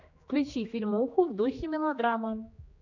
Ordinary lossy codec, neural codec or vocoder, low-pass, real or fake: AAC, 48 kbps; codec, 16 kHz, 2 kbps, X-Codec, HuBERT features, trained on general audio; 7.2 kHz; fake